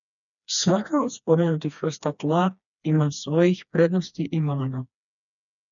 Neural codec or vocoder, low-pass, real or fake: codec, 16 kHz, 2 kbps, FreqCodec, smaller model; 7.2 kHz; fake